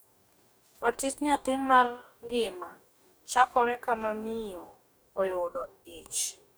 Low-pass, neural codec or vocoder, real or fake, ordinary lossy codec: none; codec, 44.1 kHz, 2.6 kbps, DAC; fake; none